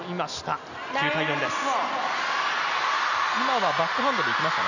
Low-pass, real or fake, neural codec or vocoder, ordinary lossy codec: 7.2 kHz; real; none; none